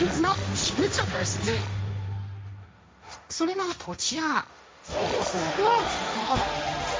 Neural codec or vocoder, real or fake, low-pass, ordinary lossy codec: codec, 16 kHz, 1.1 kbps, Voila-Tokenizer; fake; none; none